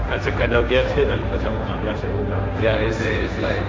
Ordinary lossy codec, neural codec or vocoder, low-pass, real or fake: none; codec, 16 kHz, 1.1 kbps, Voila-Tokenizer; none; fake